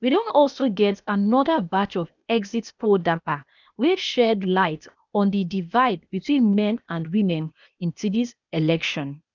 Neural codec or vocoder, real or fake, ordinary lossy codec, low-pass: codec, 16 kHz, 0.8 kbps, ZipCodec; fake; none; 7.2 kHz